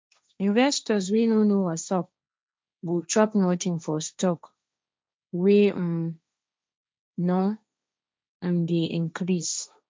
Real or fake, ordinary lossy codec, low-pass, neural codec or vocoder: fake; none; none; codec, 16 kHz, 1.1 kbps, Voila-Tokenizer